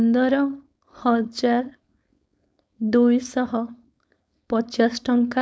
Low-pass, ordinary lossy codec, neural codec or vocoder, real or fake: none; none; codec, 16 kHz, 4.8 kbps, FACodec; fake